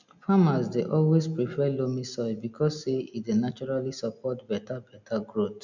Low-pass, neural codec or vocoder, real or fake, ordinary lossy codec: 7.2 kHz; none; real; none